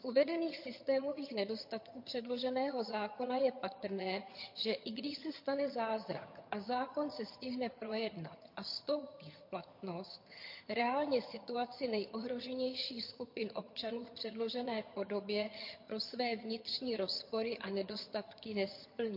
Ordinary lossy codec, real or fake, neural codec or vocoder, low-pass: MP3, 32 kbps; fake; vocoder, 22.05 kHz, 80 mel bands, HiFi-GAN; 5.4 kHz